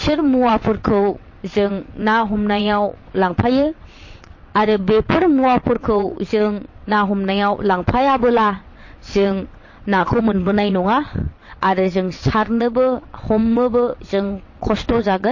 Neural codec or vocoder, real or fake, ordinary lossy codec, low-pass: vocoder, 22.05 kHz, 80 mel bands, WaveNeXt; fake; MP3, 32 kbps; 7.2 kHz